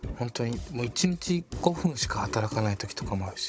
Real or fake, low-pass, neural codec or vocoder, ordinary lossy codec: fake; none; codec, 16 kHz, 16 kbps, FunCodec, trained on Chinese and English, 50 frames a second; none